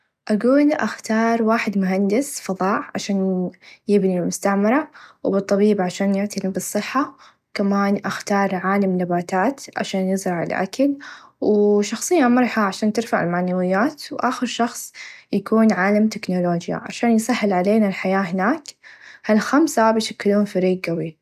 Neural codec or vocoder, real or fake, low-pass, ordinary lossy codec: none; real; 14.4 kHz; none